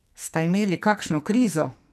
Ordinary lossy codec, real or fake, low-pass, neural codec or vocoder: none; fake; 14.4 kHz; codec, 44.1 kHz, 2.6 kbps, SNAC